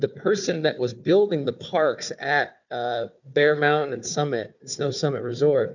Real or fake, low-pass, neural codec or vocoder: fake; 7.2 kHz; codec, 16 kHz, 4 kbps, FunCodec, trained on Chinese and English, 50 frames a second